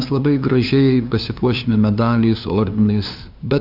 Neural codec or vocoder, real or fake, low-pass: codec, 16 kHz, 2 kbps, FunCodec, trained on LibriTTS, 25 frames a second; fake; 5.4 kHz